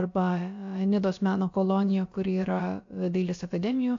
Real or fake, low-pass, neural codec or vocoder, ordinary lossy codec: fake; 7.2 kHz; codec, 16 kHz, about 1 kbps, DyCAST, with the encoder's durations; AAC, 48 kbps